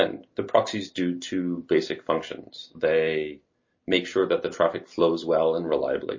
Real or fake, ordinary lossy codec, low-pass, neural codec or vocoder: real; MP3, 32 kbps; 7.2 kHz; none